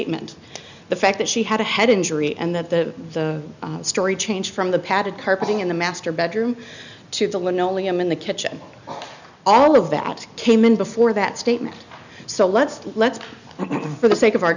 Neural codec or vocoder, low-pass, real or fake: none; 7.2 kHz; real